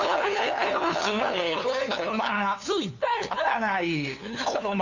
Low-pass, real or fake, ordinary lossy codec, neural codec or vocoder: 7.2 kHz; fake; none; codec, 16 kHz, 2 kbps, FunCodec, trained on LibriTTS, 25 frames a second